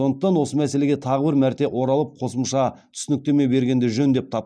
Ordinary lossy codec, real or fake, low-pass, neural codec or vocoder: none; real; none; none